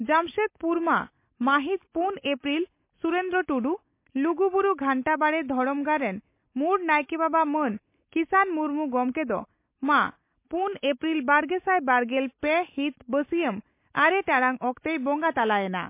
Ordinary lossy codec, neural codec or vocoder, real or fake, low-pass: MP3, 24 kbps; none; real; 3.6 kHz